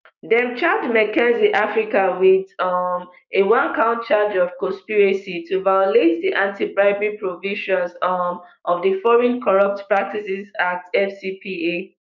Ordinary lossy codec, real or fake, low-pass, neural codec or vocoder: none; fake; 7.2 kHz; codec, 16 kHz, 6 kbps, DAC